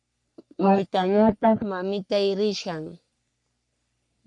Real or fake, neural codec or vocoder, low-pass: fake; codec, 44.1 kHz, 3.4 kbps, Pupu-Codec; 10.8 kHz